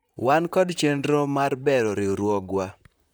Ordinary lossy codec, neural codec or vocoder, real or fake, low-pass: none; vocoder, 44.1 kHz, 128 mel bands, Pupu-Vocoder; fake; none